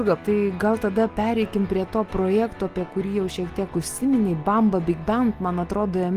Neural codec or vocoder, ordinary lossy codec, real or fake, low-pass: none; Opus, 24 kbps; real; 14.4 kHz